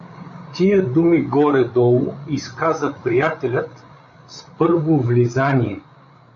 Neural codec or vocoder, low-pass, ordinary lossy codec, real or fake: codec, 16 kHz, 8 kbps, FreqCodec, larger model; 7.2 kHz; AAC, 64 kbps; fake